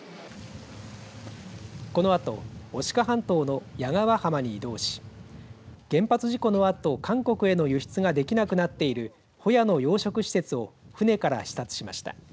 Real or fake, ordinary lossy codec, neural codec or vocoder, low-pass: real; none; none; none